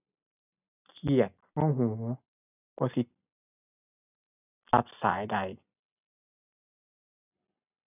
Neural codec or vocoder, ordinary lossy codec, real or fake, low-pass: none; none; real; 3.6 kHz